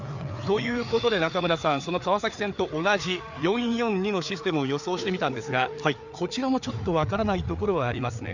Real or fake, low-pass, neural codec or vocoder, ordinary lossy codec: fake; 7.2 kHz; codec, 16 kHz, 4 kbps, FreqCodec, larger model; none